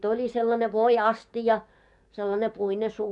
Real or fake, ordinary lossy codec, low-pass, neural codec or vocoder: fake; none; 10.8 kHz; codec, 44.1 kHz, 7.8 kbps, DAC